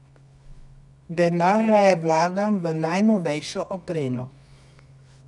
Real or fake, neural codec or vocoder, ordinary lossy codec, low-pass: fake; codec, 24 kHz, 0.9 kbps, WavTokenizer, medium music audio release; none; 10.8 kHz